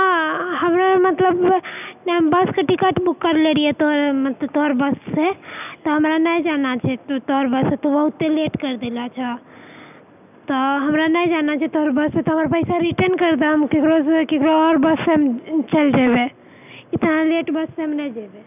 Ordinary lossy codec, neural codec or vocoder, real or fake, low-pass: none; none; real; 3.6 kHz